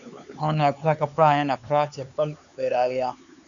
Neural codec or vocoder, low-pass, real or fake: codec, 16 kHz, 4 kbps, X-Codec, HuBERT features, trained on LibriSpeech; 7.2 kHz; fake